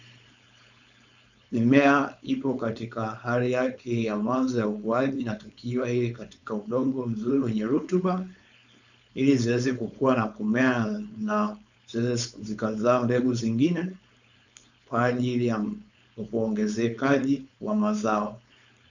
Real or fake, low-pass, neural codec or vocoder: fake; 7.2 kHz; codec, 16 kHz, 4.8 kbps, FACodec